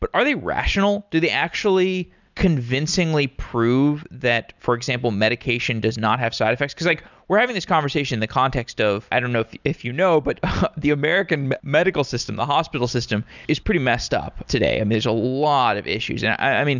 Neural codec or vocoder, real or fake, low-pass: none; real; 7.2 kHz